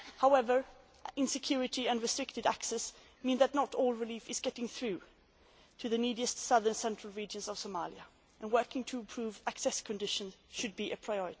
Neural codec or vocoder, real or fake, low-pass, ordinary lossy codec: none; real; none; none